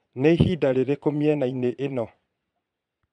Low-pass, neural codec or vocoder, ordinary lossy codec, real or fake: 9.9 kHz; vocoder, 22.05 kHz, 80 mel bands, Vocos; none; fake